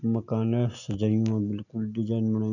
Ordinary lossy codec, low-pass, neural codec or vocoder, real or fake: none; 7.2 kHz; none; real